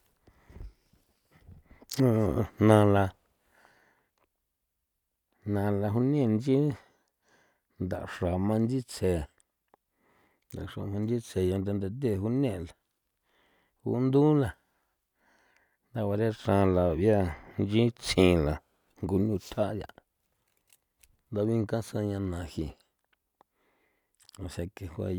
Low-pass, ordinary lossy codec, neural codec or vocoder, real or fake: 19.8 kHz; none; none; real